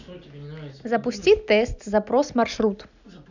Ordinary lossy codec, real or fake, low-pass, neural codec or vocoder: none; real; 7.2 kHz; none